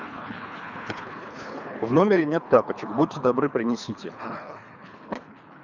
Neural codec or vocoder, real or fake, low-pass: codec, 24 kHz, 3 kbps, HILCodec; fake; 7.2 kHz